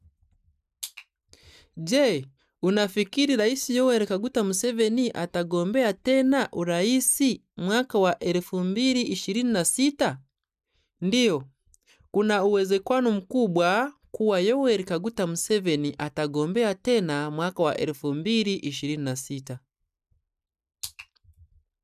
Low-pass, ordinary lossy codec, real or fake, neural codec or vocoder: 14.4 kHz; none; real; none